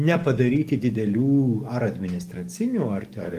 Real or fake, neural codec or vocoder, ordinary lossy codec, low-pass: fake; codec, 44.1 kHz, 7.8 kbps, DAC; Opus, 24 kbps; 14.4 kHz